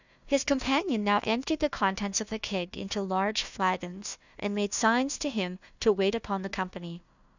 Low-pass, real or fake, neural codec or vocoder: 7.2 kHz; fake; codec, 16 kHz, 1 kbps, FunCodec, trained on Chinese and English, 50 frames a second